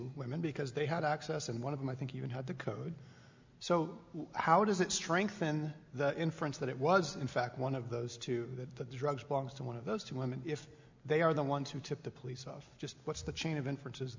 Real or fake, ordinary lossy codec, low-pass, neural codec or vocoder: real; MP3, 64 kbps; 7.2 kHz; none